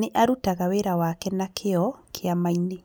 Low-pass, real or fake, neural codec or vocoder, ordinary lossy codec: none; real; none; none